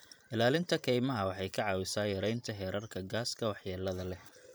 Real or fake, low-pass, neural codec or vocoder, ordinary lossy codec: fake; none; vocoder, 44.1 kHz, 128 mel bands every 512 samples, BigVGAN v2; none